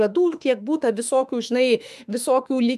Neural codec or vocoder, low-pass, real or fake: autoencoder, 48 kHz, 32 numbers a frame, DAC-VAE, trained on Japanese speech; 14.4 kHz; fake